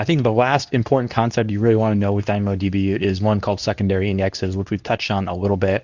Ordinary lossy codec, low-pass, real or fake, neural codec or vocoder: Opus, 64 kbps; 7.2 kHz; fake; codec, 24 kHz, 0.9 kbps, WavTokenizer, medium speech release version 2